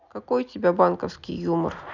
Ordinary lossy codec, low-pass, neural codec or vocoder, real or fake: none; 7.2 kHz; none; real